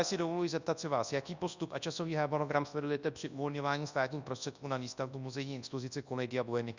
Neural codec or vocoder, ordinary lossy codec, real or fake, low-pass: codec, 24 kHz, 0.9 kbps, WavTokenizer, large speech release; Opus, 64 kbps; fake; 7.2 kHz